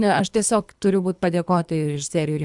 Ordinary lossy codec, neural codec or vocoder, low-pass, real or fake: MP3, 96 kbps; codec, 24 kHz, 3 kbps, HILCodec; 10.8 kHz; fake